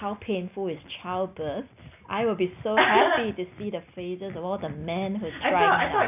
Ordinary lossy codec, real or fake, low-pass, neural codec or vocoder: none; real; 3.6 kHz; none